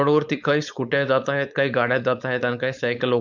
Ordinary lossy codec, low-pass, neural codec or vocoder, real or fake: none; 7.2 kHz; codec, 16 kHz, 4.8 kbps, FACodec; fake